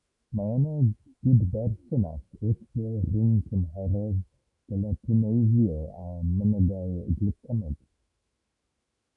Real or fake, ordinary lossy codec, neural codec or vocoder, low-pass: fake; AAC, 48 kbps; autoencoder, 48 kHz, 128 numbers a frame, DAC-VAE, trained on Japanese speech; 10.8 kHz